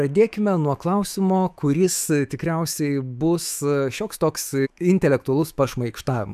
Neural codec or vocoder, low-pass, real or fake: autoencoder, 48 kHz, 128 numbers a frame, DAC-VAE, trained on Japanese speech; 14.4 kHz; fake